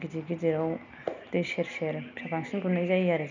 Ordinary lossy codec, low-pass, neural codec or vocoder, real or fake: none; 7.2 kHz; none; real